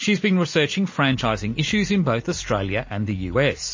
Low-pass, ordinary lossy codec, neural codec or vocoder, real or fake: 7.2 kHz; MP3, 32 kbps; none; real